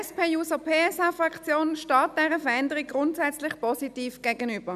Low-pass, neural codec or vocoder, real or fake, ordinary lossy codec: 14.4 kHz; none; real; none